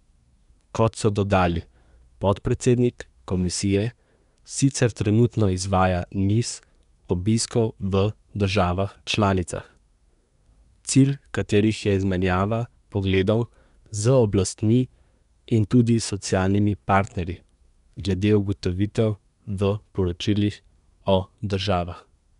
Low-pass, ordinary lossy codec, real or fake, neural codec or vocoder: 10.8 kHz; none; fake; codec, 24 kHz, 1 kbps, SNAC